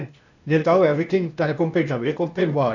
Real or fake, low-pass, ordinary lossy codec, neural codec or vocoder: fake; 7.2 kHz; none; codec, 16 kHz, 0.8 kbps, ZipCodec